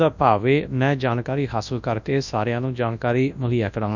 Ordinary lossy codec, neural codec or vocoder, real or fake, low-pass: none; codec, 24 kHz, 0.9 kbps, WavTokenizer, large speech release; fake; 7.2 kHz